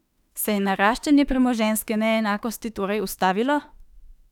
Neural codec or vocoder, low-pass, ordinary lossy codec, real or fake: autoencoder, 48 kHz, 32 numbers a frame, DAC-VAE, trained on Japanese speech; 19.8 kHz; none; fake